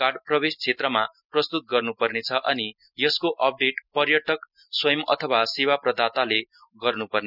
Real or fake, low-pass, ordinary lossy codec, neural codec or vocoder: real; 5.4 kHz; none; none